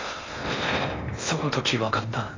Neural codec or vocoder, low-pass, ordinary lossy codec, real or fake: codec, 16 kHz in and 24 kHz out, 0.6 kbps, FocalCodec, streaming, 4096 codes; 7.2 kHz; AAC, 32 kbps; fake